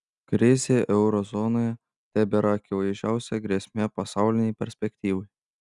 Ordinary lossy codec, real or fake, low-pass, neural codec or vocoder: Opus, 64 kbps; real; 10.8 kHz; none